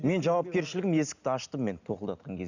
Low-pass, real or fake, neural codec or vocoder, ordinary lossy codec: 7.2 kHz; real; none; none